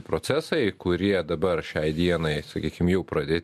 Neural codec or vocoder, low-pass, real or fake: none; 14.4 kHz; real